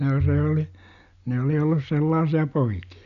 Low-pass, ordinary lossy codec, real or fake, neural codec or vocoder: 7.2 kHz; none; real; none